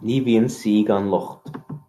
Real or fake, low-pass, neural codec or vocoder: real; 14.4 kHz; none